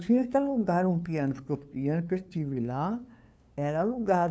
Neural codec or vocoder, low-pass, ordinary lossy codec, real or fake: codec, 16 kHz, 2 kbps, FunCodec, trained on LibriTTS, 25 frames a second; none; none; fake